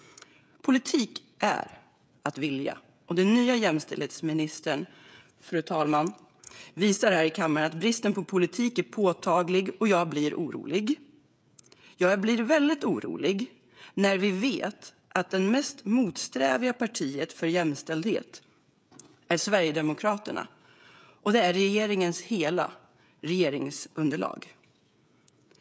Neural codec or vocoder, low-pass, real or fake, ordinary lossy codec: codec, 16 kHz, 16 kbps, FreqCodec, smaller model; none; fake; none